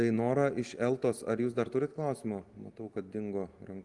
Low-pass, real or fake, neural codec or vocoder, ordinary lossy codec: 10.8 kHz; real; none; Opus, 24 kbps